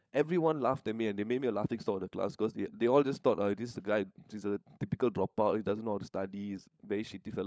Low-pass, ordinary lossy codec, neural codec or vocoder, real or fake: none; none; codec, 16 kHz, 16 kbps, FunCodec, trained on LibriTTS, 50 frames a second; fake